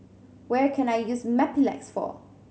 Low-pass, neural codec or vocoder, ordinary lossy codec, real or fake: none; none; none; real